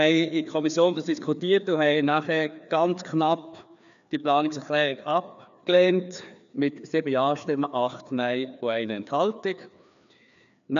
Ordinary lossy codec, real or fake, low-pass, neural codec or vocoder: MP3, 96 kbps; fake; 7.2 kHz; codec, 16 kHz, 2 kbps, FreqCodec, larger model